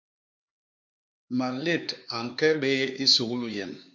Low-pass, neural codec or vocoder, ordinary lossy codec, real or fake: 7.2 kHz; codec, 16 kHz, 4 kbps, X-Codec, HuBERT features, trained on LibriSpeech; MP3, 48 kbps; fake